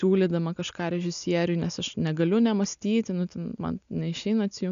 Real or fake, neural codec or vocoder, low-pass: real; none; 7.2 kHz